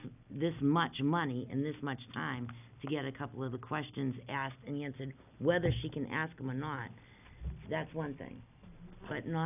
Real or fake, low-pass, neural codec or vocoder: real; 3.6 kHz; none